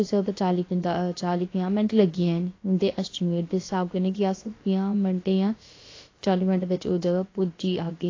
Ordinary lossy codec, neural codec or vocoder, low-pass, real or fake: AAC, 32 kbps; codec, 16 kHz, about 1 kbps, DyCAST, with the encoder's durations; 7.2 kHz; fake